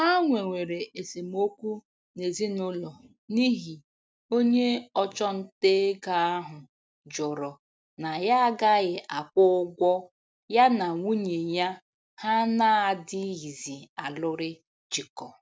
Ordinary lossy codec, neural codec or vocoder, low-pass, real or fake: none; none; none; real